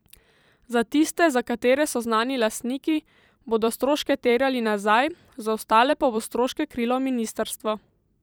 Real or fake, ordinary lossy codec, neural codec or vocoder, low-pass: fake; none; vocoder, 44.1 kHz, 128 mel bands every 256 samples, BigVGAN v2; none